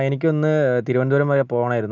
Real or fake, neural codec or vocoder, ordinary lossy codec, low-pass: real; none; none; 7.2 kHz